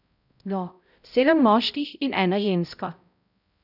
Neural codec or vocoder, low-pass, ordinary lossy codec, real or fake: codec, 16 kHz, 0.5 kbps, X-Codec, HuBERT features, trained on balanced general audio; 5.4 kHz; none; fake